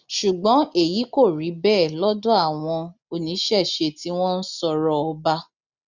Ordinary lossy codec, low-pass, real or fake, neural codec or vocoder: none; 7.2 kHz; real; none